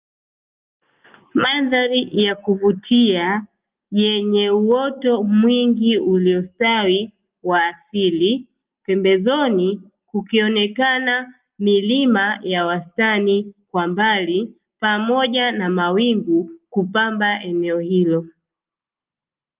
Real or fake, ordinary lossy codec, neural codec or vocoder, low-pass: real; Opus, 32 kbps; none; 3.6 kHz